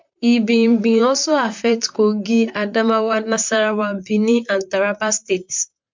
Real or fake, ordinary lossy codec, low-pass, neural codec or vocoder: fake; MP3, 64 kbps; 7.2 kHz; vocoder, 44.1 kHz, 128 mel bands, Pupu-Vocoder